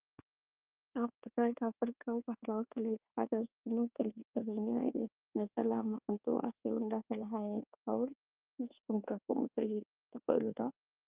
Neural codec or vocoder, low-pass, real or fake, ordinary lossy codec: codec, 24 kHz, 1.2 kbps, DualCodec; 3.6 kHz; fake; Opus, 32 kbps